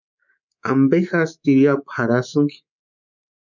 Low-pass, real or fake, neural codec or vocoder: 7.2 kHz; fake; codec, 24 kHz, 3.1 kbps, DualCodec